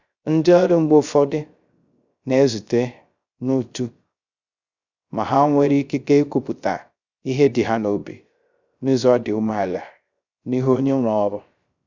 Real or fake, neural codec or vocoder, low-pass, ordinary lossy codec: fake; codec, 16 kHz, 0.3 kbps, FocalCodec; 7.2 kHz; Opus, 64 kbps